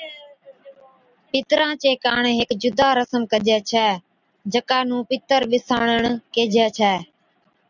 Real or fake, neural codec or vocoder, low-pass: real; none; 7.2 kHz